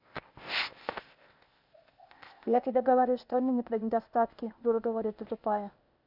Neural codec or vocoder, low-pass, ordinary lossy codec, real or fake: codec, 16 kHz, 0.8 kbps, ZipCodec; 5.4 kHz; none; fake